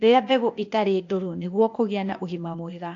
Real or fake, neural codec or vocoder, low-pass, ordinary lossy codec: fake; codec, 16 kHz, 0.8 kbps, ZipCodec; 7.2 kHz; AAC, 48 kbps